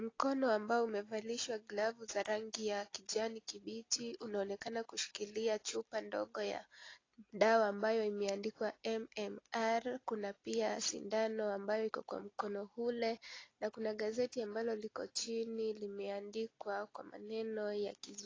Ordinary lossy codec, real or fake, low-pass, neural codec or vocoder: AAC, 32 kbps; real; 7.2 kHz; none